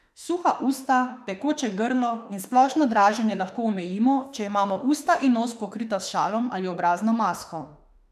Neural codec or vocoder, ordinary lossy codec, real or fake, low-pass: autoencoder, 48 kHz, 32 numbers a frame, DAC-VAE, trained on Japanese speech; none; fake; 14.4 kHz